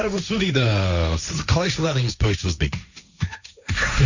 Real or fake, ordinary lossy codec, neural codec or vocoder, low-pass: fake; none; codec, 16 kHz, 1.1 kbps, Voila-Tokenizer; 7.2 kHz